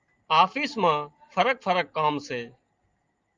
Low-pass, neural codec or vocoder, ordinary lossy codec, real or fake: 7.2 kHz; none; Opus, 24 kbps; real